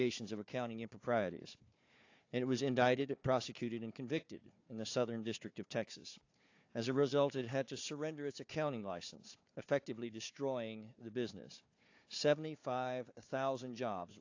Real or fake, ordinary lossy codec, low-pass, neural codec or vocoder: fake; AAC, 48 kbps; 7.2 kHz; codec, 16 kHz, 4 kbps, FunCodec, trained on Chinese and English, 50 frames a second